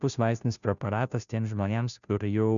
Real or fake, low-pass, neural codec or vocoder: fake; 7.2 kHz; codec, 16 kHz, 0.5 kbps, FunCodec, trained on Chinese and English, 25 frames a second